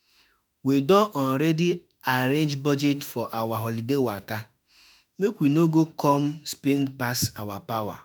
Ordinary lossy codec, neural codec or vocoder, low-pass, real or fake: none; autoencoder, 48 kHz, 32 numbers a frame, DAC-VAE, trained on Japanese speech; none; fake